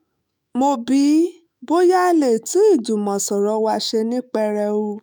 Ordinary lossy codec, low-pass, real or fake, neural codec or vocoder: none; none; fake; autoencoder, 48 kHz, 128 numbers a frame, DAC-VAE, trained on Japanese speech